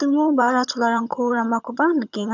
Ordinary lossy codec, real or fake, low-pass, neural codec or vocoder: none; fake; 7.2 kHz; vocoder, 22.05 kHz, 80 mel bands, HiFi-GAN